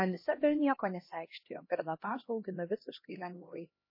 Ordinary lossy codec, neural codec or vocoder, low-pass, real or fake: MP3, 24 kbps; codec, 16 kHz, 1 kbps, X-Codec, HuBERT features, trained on LibriSpeech; 5.4 kHz; fake